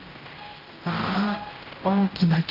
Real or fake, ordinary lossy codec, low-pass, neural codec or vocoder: fake; Opus, 24 kbps; 5.4 kHz; codec, 16 kHz, 0.5 kbps, X-Codec, HuBERT features, trained on general audio